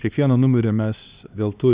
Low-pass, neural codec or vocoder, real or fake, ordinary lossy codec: 3.6 kHz; codec, 16 kHz, 2 kbps, X-Codec, HuBERT features, trained on LibriSpeech; fake; Opus, 64 kbps